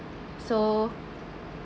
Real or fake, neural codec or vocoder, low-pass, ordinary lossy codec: real; none; none; none